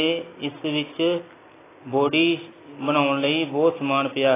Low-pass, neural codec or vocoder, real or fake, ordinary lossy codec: 3.6 kHz; none; real; AAC, 16 kbps